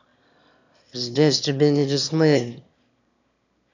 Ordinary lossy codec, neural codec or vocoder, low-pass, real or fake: none; autoencoder, 22.05 kHz, a latent of 192 numbers a frame, VITS, trained on one speaker; 7.2 kHz; fake